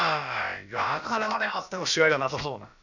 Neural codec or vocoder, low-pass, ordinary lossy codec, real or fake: codec, 16 kHz, about 1 kbps, DyCAST, with the encoder's durations; 7.2 kHz; none; fake